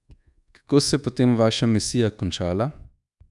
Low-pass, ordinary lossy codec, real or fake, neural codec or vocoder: 10.8 kHz; none; fake; codec, 24 kHz, 1.2 kbps, DualCodec